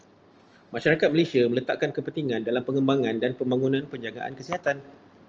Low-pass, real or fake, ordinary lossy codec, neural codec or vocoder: 7.2 kHz; real; Opus, 24 kbps; none